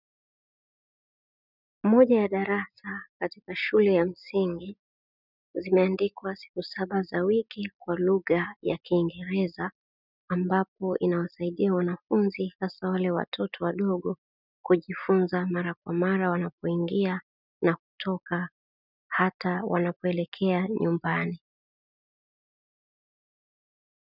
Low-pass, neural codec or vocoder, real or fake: 5.4 kHz; none; real